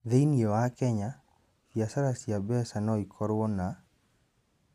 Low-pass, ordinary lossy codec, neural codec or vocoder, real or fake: 14.4 kHz; none; none; real